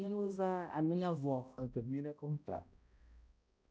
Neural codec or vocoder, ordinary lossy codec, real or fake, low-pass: codec, 16 kHz, 0.5 kbps, X-Codec, HuBERT features, trained on balanced general audio; none; fake; none